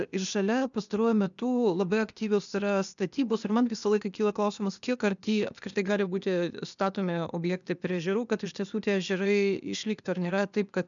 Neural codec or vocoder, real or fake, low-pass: codec, 16 kHz, 0.8 kbps, ZipCodec; fake; 7.2 kHz